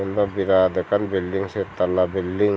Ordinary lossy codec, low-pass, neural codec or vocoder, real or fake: none; none; none; real